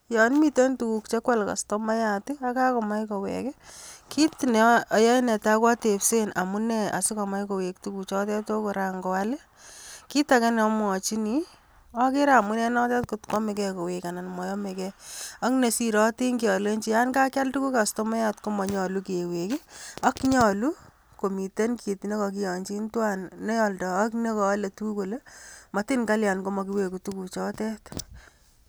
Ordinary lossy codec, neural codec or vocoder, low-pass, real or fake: none; none; none; real